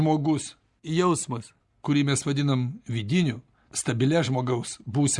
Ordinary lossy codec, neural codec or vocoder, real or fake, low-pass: Opus, 64 kbps; none; real; 10.8 kHz